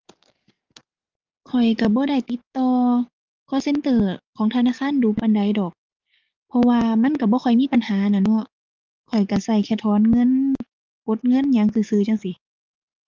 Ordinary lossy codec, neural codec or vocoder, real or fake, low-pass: Opus, 32 kbps; codec, 44.1 kHz, 7.8 kbps, DAC; fake; 7.2 kHz